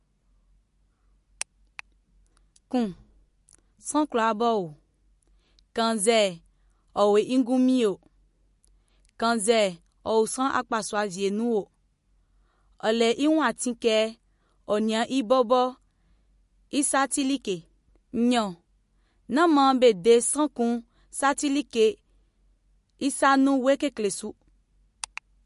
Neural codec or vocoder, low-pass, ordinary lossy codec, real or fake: none; 14.4 kHz; MP3, 48 kbps; real